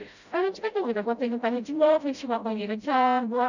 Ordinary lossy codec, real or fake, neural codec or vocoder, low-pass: none; fake; codec, 16 kHz, 0.5 kbps, FreqCodec, smaller model; 7.2 kHz